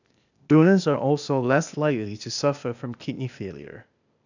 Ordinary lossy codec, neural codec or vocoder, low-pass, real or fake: none; codec, 16 kHz, 0.8 kbps, ZipCodec; 7.2 kHz; fake